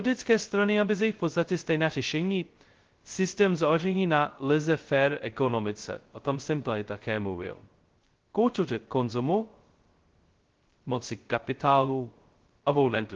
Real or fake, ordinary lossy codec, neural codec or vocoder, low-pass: fake; Opus, 16 kbps; codec, 16 kHz, 0.2 kbps, FocalCodec; 7.2 kHz